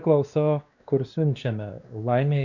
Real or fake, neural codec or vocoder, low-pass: fake; codec, 16 kHz, 2 kbps, X-Codec, WavLM features, trained on Multilingual LibriSpeech; 7.2 kHz